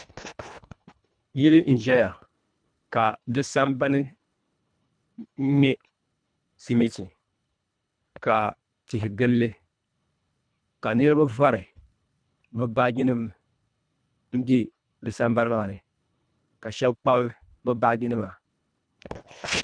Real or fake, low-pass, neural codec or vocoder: fake; 9.9 kHz; codec, 24 kHz, 1.5 kbps, HILCodec